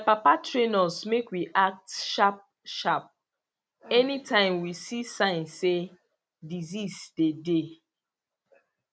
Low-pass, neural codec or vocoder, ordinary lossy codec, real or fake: none; none; none; real